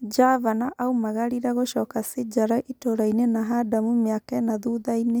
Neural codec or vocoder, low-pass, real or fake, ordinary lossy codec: none; none; real; none